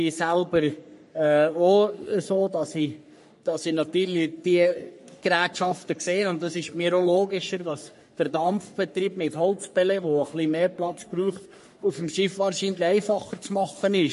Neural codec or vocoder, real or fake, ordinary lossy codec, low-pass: codec, 44.1 kHz, 3.4 kbps, Pupu-Codec; fake; MP3, 48 kbps; 14.4 kHz